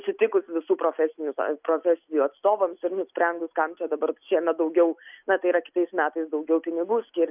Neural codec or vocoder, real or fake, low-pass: none; real; 3.6 kHz